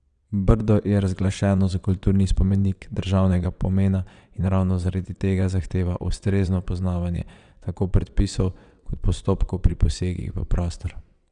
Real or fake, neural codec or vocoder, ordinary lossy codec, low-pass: fake; vocoder, 22.05 kHz, 80 mel bands, WaveNeXt; none; 9.9 kHz